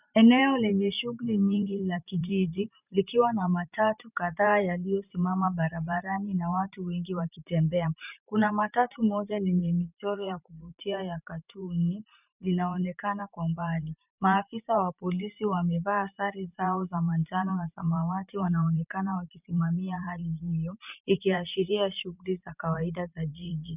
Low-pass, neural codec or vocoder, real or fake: 3.6 kHz; vocoder, 44.1 kHz, 128 mel bands every 512 samples, BigVGAN v2; fake